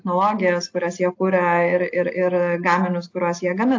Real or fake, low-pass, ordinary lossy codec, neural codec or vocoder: real; 7.2 kHz; AAC, 48 kbps; none